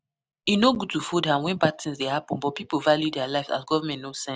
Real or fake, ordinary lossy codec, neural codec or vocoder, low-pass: real; none; none; none